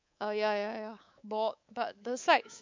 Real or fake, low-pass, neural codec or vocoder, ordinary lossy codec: fake; 7.2 kHz; codec, 24 kHz, 3.1 kbps, DualCodec; MP3, 64 kbps